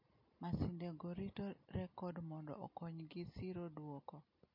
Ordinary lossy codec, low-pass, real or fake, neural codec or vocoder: MP3, 32 kbps; 5.4 kHz; real; none